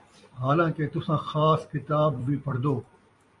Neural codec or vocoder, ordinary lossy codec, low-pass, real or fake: vocoder, 44.1 kHz, 128 mel bands every 256 samples, BigVGAN v2; MP3, 48 kbps; 10.8 kHz; fake